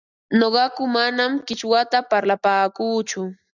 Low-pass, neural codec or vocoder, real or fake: 7.2 kHz; none; real